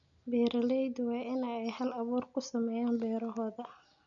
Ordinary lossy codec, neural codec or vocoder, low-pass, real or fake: none; none; 7.2 kHz; real